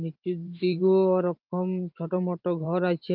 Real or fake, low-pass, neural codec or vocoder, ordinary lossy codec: fake; 5.4 kHz; vocoder, 44.1 kHz, 128 mel bands every 512 samples, BigVGAN v2; Opus, 32 kbps